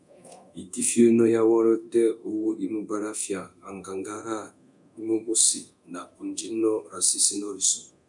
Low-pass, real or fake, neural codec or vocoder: 10.8 kHz; fake; codec, 24 kHz, 0.9 kbps, DualCodec